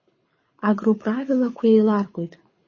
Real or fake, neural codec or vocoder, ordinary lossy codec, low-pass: fake; codec, 24 kHz, 6 kbps, HILCodec; MP3, 32 kbps; 7.2 kHz